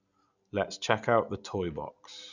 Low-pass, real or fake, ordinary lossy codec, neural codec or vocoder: 7.2 kHz; real; none; none